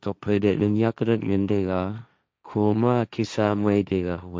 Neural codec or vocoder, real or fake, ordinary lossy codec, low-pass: codec, 16 kHz, 1.1 kbps, Voila-Tokenizer; fake; none; none